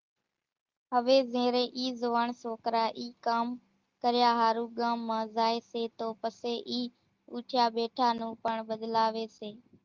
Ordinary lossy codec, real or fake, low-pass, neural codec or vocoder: Opus, 32 kbps; real; 7.2 kHz; none